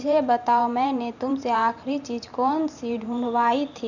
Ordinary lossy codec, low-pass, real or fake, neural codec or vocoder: none; 7.2 kHz; fake; vocoder, 44.1 kHz, 128 mel bands every 512 samples, BigVGAN v2